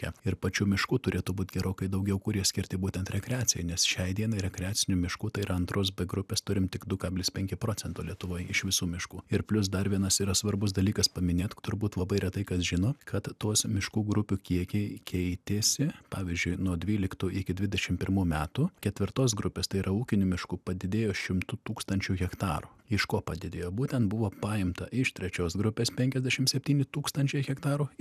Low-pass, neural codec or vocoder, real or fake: 14.4 kHz; none; real